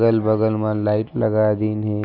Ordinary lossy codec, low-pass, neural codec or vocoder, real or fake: none; 5.4 kHz; none; real